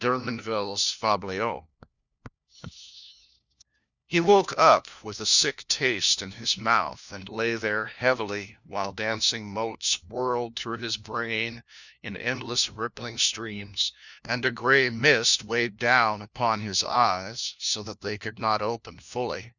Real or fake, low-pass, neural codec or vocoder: fake; 7.2 kHz; codec, 16 kHz, 1 kbps, FunCodec, trained on LibriTTS, 50 frames a second